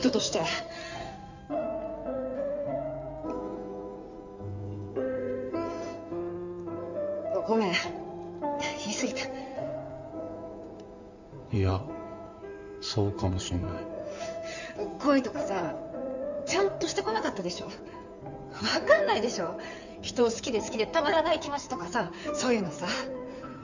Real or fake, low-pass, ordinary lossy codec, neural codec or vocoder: fake; 7.2 kHz; none; codec, 16 kHz in and 24 kHz out, 2.2 kbps, FireRedTTS-2 codec